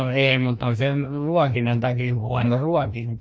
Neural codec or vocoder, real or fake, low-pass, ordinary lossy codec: codec, 16 kHz, 1 kbps, FreqCodec, larger model; fake; none; none